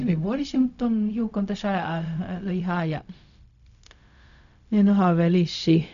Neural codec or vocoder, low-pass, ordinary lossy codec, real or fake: codec, 16 kHz, 0.4 kbps, LongCat-Audio-Codec; 7.2 kHz; none; fake